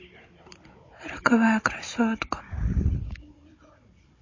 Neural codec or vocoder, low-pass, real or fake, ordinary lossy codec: codec, 16 kHz, 16 kbps, FunCodec, trained on Chinese and English, 50 frames a second; 7.2 kHz; fake; MP3, 32 kbps